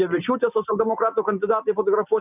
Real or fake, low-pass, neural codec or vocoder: real; 3.6 kHz; none